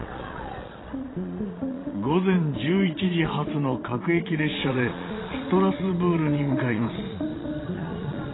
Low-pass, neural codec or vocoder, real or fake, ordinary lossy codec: 7.2 kHz; vocoder, 22.05 kHz, 80 mel bands, Vocos; fake; AAC, 16 kbps